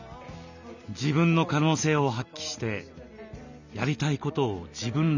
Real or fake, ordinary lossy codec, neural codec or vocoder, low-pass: real; none; none; 7.2 kHz